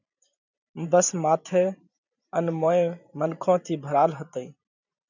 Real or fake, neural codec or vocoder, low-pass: fake; vocoder, 44.1 kHz, 128 mel bands every 512 samples, BigVGAN v2; 7.2 kHz